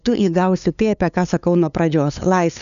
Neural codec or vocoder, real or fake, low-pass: codec, 16 kHz, 2 kbps, FunCodec, trained on LibriTTS, 25 frames a second; fake; 7.2 kHz